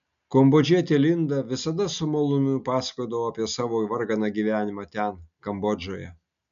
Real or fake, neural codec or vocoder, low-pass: real; none; 7.2 kHz